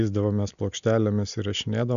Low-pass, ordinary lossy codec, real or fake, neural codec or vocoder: 7.2 kHz; MP3, 96 kbps; real; none